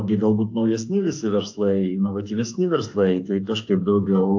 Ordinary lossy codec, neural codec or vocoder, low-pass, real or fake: AAC, 48 kbps; autoencoder, 48 kHz, 32 numbers a frame, DAC-VAE, trained on Japanese speech; 7.2 kHz; fake